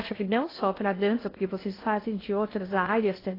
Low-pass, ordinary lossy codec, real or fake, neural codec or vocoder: 5.4 kHz; AAC, 24 kbps; fake; codec, 16 kHz in and 24 kHz out, 0.6 kbps, FocalCodec, streaming, 2048 codes